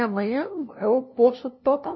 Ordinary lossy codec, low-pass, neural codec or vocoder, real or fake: MP3, 24 kbps; 7.2 kHz; codec, 16 kHz, 0.5 kbps, FunCodec, trained on LibriTTS, 25 frames a second; fake